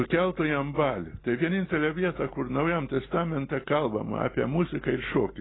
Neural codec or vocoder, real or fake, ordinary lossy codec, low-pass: none; real; AAC, 16 kbps; 7.2 kHz